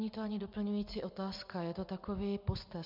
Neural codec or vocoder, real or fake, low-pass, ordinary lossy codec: none; real; 5.4 kHz; MP3, 48 kbps